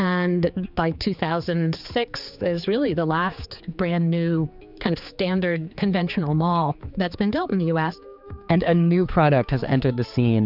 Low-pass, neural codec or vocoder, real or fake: 5.4 kHz; codec, 16 kHz, 4 kbps, X-Codec, HuBERT features, trained on general audio; fake